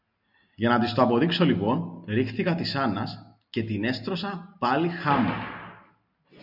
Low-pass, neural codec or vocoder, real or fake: 5.4 kHz; none; real